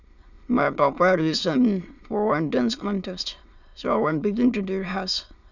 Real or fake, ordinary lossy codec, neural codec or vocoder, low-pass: fake; none; autoencoder, 22.05 kHz, a latent of 192 numbers a frame, VITS, trained on many speakers; 7.2 kHz